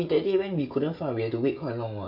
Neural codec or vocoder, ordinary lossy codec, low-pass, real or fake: codec, 16 kHz, 16 kbps, FreqCodec, smaller model; none; 5.4 kHz; fake